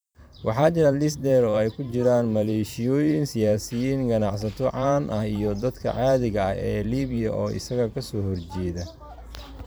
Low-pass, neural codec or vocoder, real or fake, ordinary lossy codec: none; vocoder, 44.1 kHz, 128 mel bands every 512 samples, BigVGAN v2; fake; none